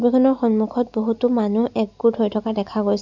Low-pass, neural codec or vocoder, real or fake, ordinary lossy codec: 7.2 kHz; none; real; none